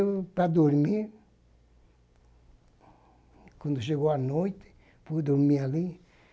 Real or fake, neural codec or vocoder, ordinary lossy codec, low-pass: real; none; none; none